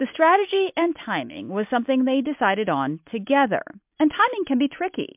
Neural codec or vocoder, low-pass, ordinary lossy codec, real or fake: none; 3.6 kHz; MP3, 32 kbps; real